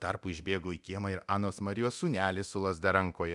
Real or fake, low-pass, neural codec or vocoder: fake; 10.8 kHz; codec, 24 kHz, 0.9 kbps, DualCodec